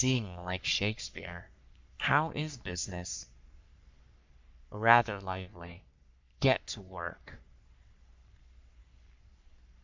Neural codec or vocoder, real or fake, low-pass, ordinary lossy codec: codec, 44.1 kHz, 3.4 kbps, Pupu-Codec; fake; 7.2 kHz; MP3, 64 kbps